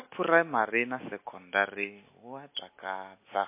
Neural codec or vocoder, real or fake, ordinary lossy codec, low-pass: none; real; MP3, 24 kbps; 3.6 kHz